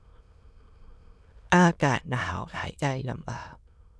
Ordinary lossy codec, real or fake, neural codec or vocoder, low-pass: none; fake; autoencoder, 22.05 kHz, a latent of 192 numbers a frame, VITS, trained on many speakers; none